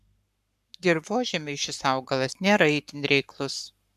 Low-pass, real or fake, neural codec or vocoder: 14.4 kHz; fake; codec, 44.1 kHz, 7.8 kbps, Pupu-Codec